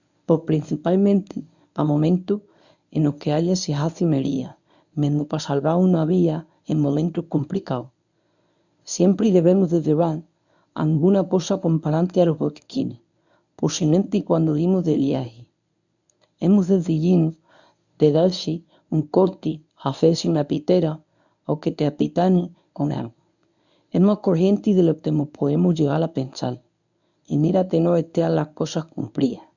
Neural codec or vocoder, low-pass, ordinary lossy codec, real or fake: codec, 24 kHz, 0.9 kbps, WavTokenizer, medium speech release version 1; 7.2 kHz; none; fake